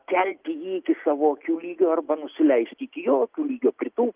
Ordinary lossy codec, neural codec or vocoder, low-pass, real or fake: Opus, 32 kbps; none; 3.6 kHz; real